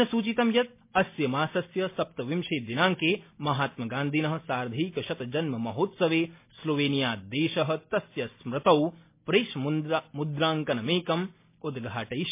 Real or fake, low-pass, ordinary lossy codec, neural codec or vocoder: real; 3.6 kHz; MP3, 24 kbps; none